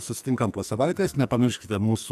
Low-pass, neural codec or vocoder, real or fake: 14.4 kHz; codec, 44.1 kHz, 2.6 kbps, SNAC; fake